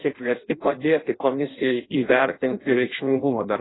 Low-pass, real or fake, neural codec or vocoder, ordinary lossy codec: 7.2 kHz; fake; codec, 16 kHz in and 24 kHz out, 0.6 kbps, FireRedTTS-2 codec; AAC, 16 kbps